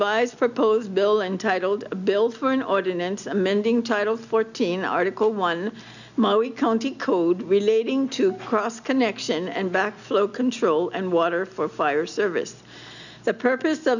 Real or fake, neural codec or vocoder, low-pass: real; none; 7.2 kHz